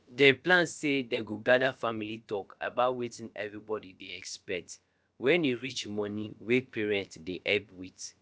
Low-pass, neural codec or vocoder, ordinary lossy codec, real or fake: none; codec, 16 kHz, about 1 kbps, DyCAST, with the encoder's durations; none; fake